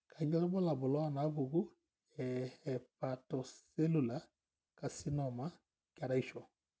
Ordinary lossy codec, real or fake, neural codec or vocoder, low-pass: none; real; none; none